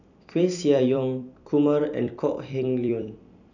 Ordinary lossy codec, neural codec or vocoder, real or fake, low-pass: none; vocoder, 44.1 kHz, 128 mel bands every 256 samples, BigVGAN v2; fake; 7.2 kHz